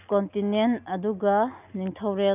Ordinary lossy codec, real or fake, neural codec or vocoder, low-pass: none; real; none; 3.6 kHz